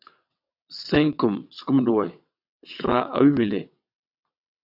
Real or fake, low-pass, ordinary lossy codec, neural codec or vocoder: fake; 5.4 kHz; AAC, 48 kbps; vocoder, 22.05 kHz, 80 mel bands, WaveNeXt